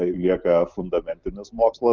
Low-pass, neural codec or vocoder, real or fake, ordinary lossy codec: 7.2 kHz; none; real; Opus, 24 kbps